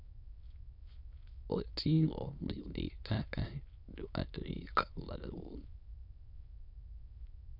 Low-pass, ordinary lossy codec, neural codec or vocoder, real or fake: 5.4 kHz; none; autoencoder, 22.05 kHz, a latent of 192 numbers a frame, VITS, trained on many speakers; fake